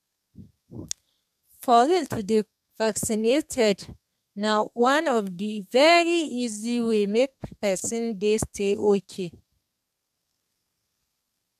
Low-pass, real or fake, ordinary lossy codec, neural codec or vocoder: 14.4 kHz; fake; MP3, 96 kbps; codec, 32 kHz, 1.9 kbps, SNAC